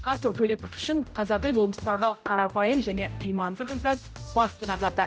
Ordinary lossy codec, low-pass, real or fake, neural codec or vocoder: none; none; fake; codec, 16 kHz, 0.5 kbps, X-Codec, HuBERT features, trained on general audio